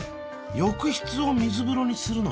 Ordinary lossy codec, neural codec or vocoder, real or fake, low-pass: none; none; real; none